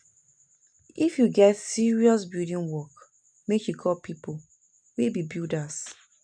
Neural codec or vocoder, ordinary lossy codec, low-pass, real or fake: none; none; 9.9 kHz; real